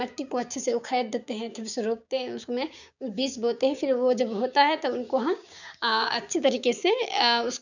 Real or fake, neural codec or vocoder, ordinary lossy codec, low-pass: fake; codec, 16 kHz, 6 kbps, DAC; none; 7.2 kHz